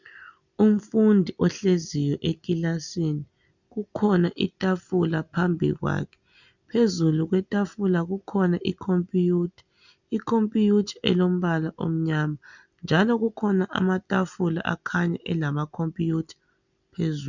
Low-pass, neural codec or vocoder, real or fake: 7.2 kHz; none; real